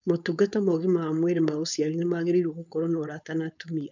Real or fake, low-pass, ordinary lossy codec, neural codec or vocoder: fake; 7.2 kHz; none; codec, 16 kHz, 4.8 kbps, FACodec